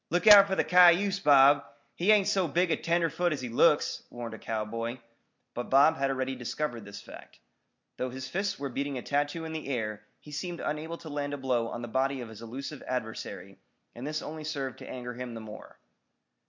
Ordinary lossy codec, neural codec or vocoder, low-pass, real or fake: MP3, 64 kbps; none; 7.2 kHz; real